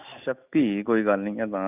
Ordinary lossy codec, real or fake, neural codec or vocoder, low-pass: none; real; none; 3.6 kHz